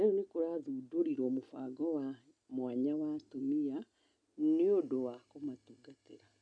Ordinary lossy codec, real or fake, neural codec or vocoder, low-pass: none; real; none; 9.9 kHz